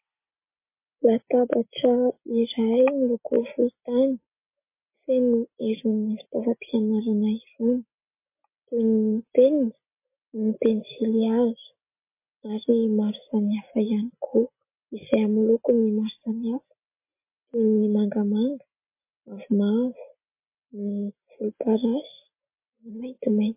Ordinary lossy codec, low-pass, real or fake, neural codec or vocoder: MP3, 24 kbps; 3.6 kHz; real; none